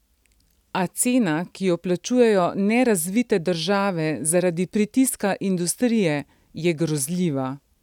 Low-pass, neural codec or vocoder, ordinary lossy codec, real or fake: 19.8 kHz; none; none; real